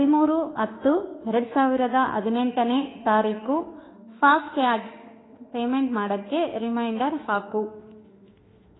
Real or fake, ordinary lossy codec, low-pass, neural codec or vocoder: fake; AAC, 16 kbps; 7.2 kHz; autoencoder, 48 kHz, 32 numbers a frame, DAC-VAE, trained on Japanese speech